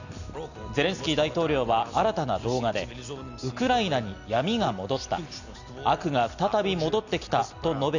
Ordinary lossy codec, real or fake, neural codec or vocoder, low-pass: none; real; none; 7.2 kHz